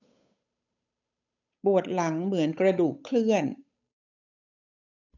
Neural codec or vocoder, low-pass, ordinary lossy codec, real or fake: codec, 16 kHz, 8 kbps, FunCodec, trained on Chinese and English, 25 frames a second; 7.2 kHz; none; fake